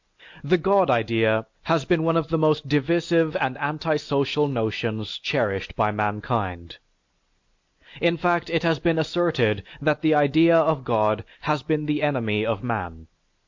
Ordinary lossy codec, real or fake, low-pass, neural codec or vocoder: MP3, 48 kbps; real; 7.2 kHz; none